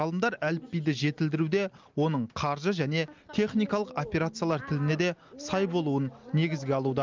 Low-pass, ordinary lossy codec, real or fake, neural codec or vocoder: 7.2 kHz; Opus, 24 kbps; real; none